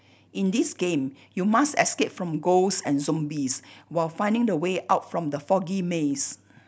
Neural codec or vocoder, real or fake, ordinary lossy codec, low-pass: none; real; none; none